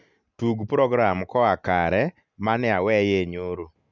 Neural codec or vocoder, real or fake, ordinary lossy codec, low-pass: none; real; none; 7.2 kHz